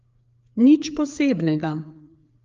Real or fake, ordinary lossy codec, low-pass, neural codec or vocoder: fake; Opus, 32 kbps; 7.2 kHz; codec, 16 kHz, 4 kbps, FreqCodec, larger model